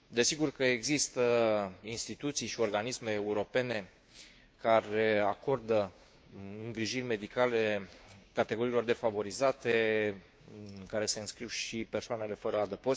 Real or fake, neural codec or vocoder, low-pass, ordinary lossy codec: fake; codec, 16 kHz, 6 kbps, DAC; none; none